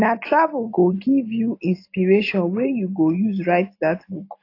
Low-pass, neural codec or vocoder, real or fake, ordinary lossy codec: 5.4 kHz; none; real; AAC, 32 kbps